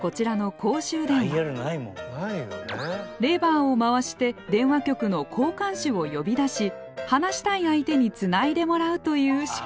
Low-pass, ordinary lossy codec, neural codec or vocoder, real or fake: none; none; none; real